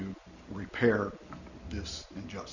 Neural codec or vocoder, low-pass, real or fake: none; 7.2 kHz; real